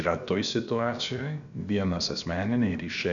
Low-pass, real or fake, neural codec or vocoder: 7.2 kHz; fake; codec, 16 kHz, about 1 kbps, DyCAST, with the encoder's durations